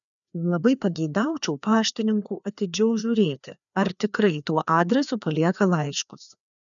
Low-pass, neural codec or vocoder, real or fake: 7.2 kHz; codec, 16 kHz, 2 kbps, FreqCodec, larger model; fake